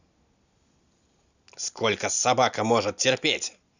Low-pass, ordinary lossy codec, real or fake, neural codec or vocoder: 7.2 kHz; none; real; none